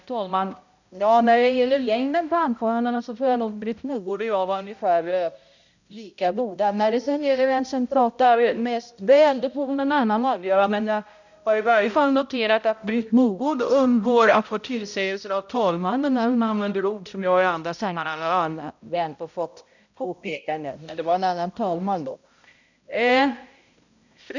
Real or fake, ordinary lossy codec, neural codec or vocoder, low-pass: fake; none; codec, 16 kHz, 0.5 kbps, X-Codec, HuBERT features, trained on balanced general audio; 7.2 kHz